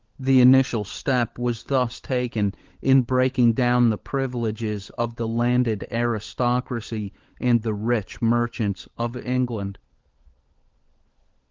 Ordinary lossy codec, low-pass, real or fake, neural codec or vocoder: Opus, 16 kbps; 7.2 kHz; fake; codec, 16 kHz, 16 kbps, FunCodec, trained on LibriTTS, 50 frames a second